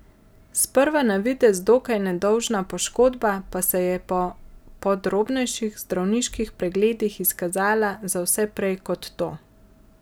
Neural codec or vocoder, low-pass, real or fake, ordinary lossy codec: none; none; real; none